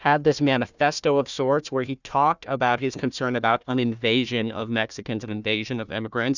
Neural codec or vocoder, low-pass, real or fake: codec, 16 kHz, 1 kbps, FunCodec, trained on Chinese and English, 50 frames a second; 7.2 kHz; fake